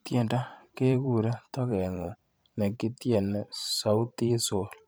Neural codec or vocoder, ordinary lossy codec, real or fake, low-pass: vocoder, 44.1 kHz, 128 mel bands every 512 samples, BigVGAN v2; none; fake; none